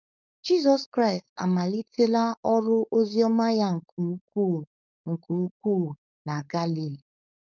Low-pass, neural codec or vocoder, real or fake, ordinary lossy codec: 7.2 kHz; codec, 16 kHz, 4.8 kbps, FACodec; fake; none